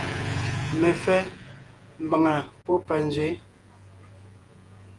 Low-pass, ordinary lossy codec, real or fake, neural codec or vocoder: 10.8 kHz; Opus, 24 kbps; fake; vocoder, 48 kHz, 128 mel bands, Vocos